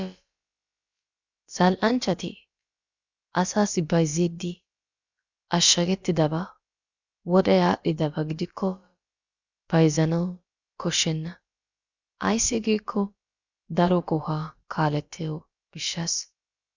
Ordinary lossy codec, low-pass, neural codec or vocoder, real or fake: Opus, 64 kbps; 7.2 kHz; codec, 16 kHz, about 1 kbps, DyCAST, with the encoder's durations; fake